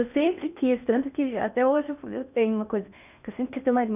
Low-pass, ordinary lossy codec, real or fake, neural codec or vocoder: 3.6 kHz; none; fake; codec, 16 kHz in and 24 kHz out, 0.8 kbps, FocalCodec, streaming, 65536 codes